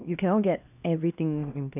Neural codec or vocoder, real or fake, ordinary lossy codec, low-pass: codec, 16 kHz, 1 kbps, X-Codec, HuBERT features, trained on LibriSpeech; fake; none; 3.6 kHz